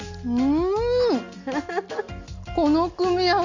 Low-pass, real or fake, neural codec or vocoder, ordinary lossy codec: 7.2 kHz; real; none; Opus, 64 kbps